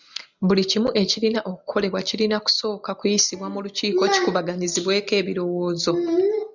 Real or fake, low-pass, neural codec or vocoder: real; 7.2 kHz; none